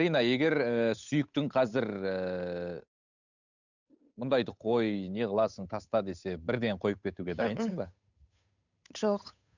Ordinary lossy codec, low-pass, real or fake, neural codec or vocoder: none; 7.2 kHz; fake; codec, 16 kHz, 8 kbps, FunCodec, trained on Chinese and English, 25 frames a second